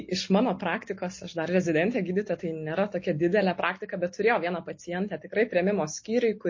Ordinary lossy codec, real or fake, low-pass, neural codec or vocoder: MP3, 32 kbps; real; 7.2 kHz; none